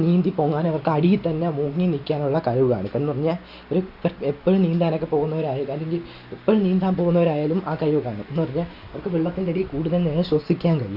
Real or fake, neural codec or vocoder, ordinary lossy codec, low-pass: real; none; Opus, 64 kbps; 5.4 kHz